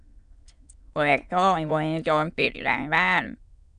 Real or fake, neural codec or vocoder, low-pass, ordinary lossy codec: fake; autoencoder, 22.05 kHz, a latent of 192 numbers a frame, VITS, trained on many speakers; 9.9 kHz; AAC, 96 kbps